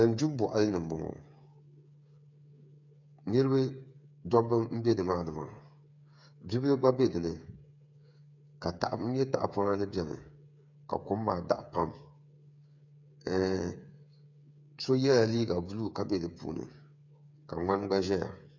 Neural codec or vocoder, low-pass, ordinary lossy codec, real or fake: codec, 16 kHz, 8 kbps, FreqCodec, smaller model; 7.2 kHz; MP3, 64 kbps; fake